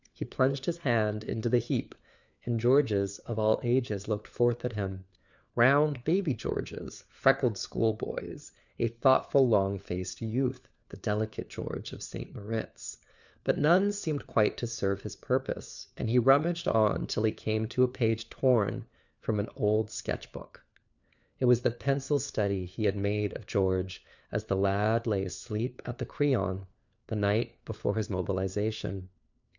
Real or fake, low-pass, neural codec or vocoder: fake; 7.2 kHz; codec, 16 kHz, 4 kbps, FunCodec, trained on LibriTTS, 50 frames a second